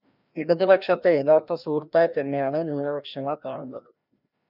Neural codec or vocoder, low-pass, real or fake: codec, 16 kHz, 1 kbps, FreqCodec, larger model; 5.4 kHz; fake